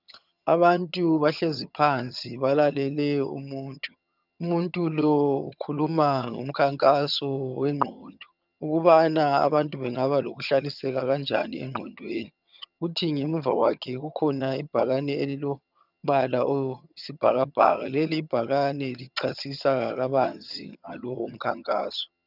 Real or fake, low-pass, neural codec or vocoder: fake; 5.4 kHz; vocoder, 22.05 kHz, 80 mel bands, HiFi-GAN